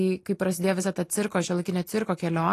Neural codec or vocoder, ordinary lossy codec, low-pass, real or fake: none; AAC, 48 kbps; 14.4 kHz; real